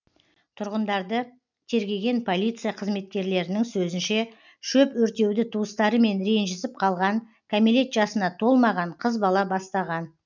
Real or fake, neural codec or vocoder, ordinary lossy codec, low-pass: real; none; none; 7.2 kHz